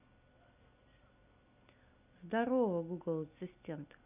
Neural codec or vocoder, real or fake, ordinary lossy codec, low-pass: none; real; none; 3.6 kHz